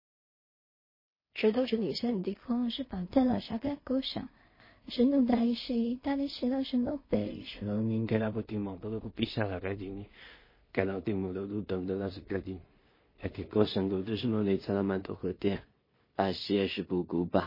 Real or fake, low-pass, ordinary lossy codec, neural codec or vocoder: fake; 5.4 kHz; MP3, 24 kbps; codec, 16 kHz in and 24 kHz out, 0.4 kbps, LongCat-Audio-Codec, two codebook decoder